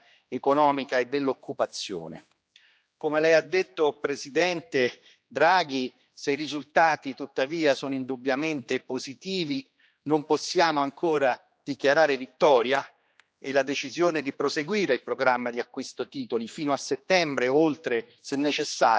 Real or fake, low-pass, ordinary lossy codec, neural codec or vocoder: fake; none; none; codec, 16 kHz, 2 kbps, X-Codec, HuBERT features, trained on general audio